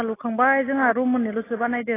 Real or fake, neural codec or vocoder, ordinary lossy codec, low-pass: real; none; AAC, 16 kbps; 3.6 kHz